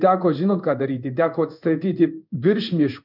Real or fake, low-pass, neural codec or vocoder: fake; 5.4 kHz; codec, 16 kHz in and 24 kHz out, 1 kbps, XY-Tokenizer